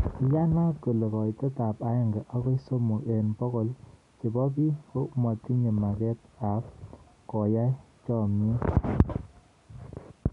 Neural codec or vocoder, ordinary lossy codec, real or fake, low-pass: none; none; real; 10.8 kHz